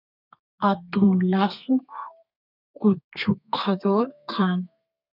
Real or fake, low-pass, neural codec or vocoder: fake; 5.4 kHz; codec, 32 kHz, 1.9 kbps, SNAC